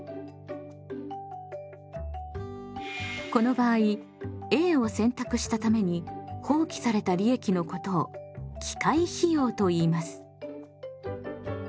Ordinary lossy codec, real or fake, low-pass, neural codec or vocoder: none; real; none; none